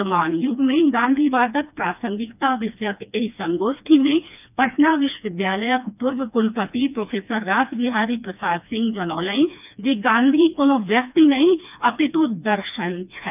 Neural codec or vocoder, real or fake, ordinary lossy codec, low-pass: codec, 16 kHz, 2 kbps, FreqCodec, smaller model; fake; none; 3.6 kHz